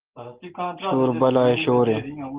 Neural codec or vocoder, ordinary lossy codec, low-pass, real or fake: none; Opus, 16 kbps; 3.6 kHz; real